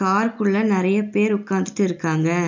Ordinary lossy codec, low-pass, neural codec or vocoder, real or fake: none; 7.2 kHz; none; real